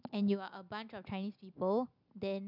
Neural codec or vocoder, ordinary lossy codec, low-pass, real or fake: none; none; 5.4 kHz; real